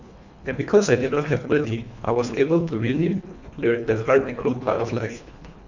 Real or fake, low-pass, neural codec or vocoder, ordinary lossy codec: fake; 7.2 kHz; codec, 24 kHz, 1.5 kbps, HILCodec; none